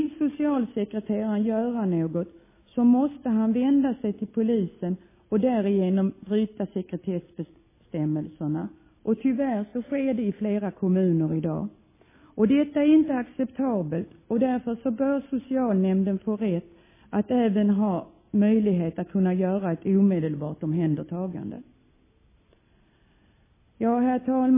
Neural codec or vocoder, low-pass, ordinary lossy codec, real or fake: none; 3.6 kHz; MP3, 16 kbps; real